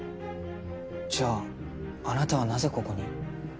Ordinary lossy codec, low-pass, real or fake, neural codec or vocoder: none; none; real; none